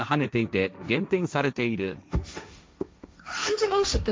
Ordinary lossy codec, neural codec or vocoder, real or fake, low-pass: none; codec, 16 kHz, 1.1 kbps, Voila-Tokenizer; fake; none